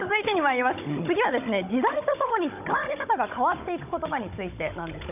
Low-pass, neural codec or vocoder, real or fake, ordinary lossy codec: 3.6 kHz; codec, 16 kHz, 16 kbps, FunCodec, trained on Chinese and English, 50 frames a second; fake; none